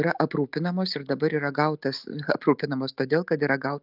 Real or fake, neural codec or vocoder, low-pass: real; none; 5.4 kHz